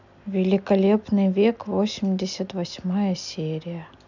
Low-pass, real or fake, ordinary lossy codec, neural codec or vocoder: 7.2 kHz; real; none; none